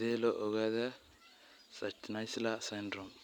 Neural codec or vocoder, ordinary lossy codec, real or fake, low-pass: none; none; real; 19.8 kHz